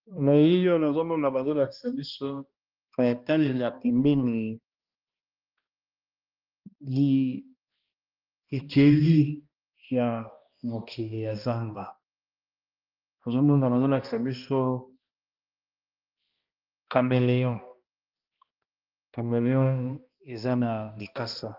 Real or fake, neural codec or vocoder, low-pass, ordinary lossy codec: fake; codec, 16 kHz, 1 kbps, X-Codec, HuBERT features, trained on balanced general audio; 5.4 kHz; Opus, 32 kbps